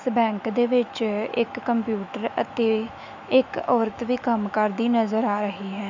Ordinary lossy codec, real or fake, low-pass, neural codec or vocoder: none; fake; 7.2 kHz; autoencoder, 48 kHz, 128 numbers a frame, DAC-VAE, trained on Japanese speech